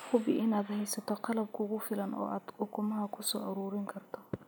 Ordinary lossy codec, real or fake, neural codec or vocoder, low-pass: none; real; none; none